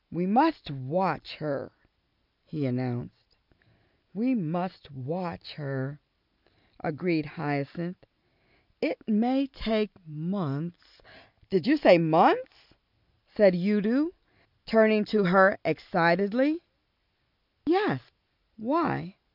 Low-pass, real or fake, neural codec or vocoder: 5.4 kHz; real; none